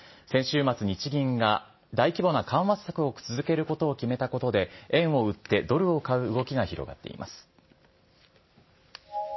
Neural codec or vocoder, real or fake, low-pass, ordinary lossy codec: none; real; 7.2 kHz; MP3, 24 kbps